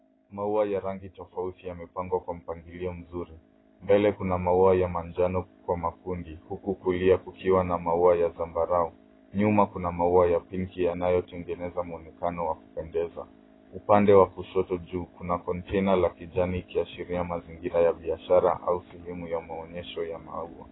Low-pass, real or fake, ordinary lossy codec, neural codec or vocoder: 7.2 kHz; real; AAC, 16 kbps; none